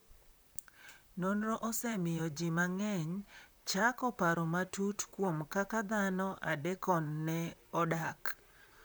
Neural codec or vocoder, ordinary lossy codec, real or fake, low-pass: vocoder, 44.1 kHz, 128 mel bands, Pupu-Vocoder; none; fake; none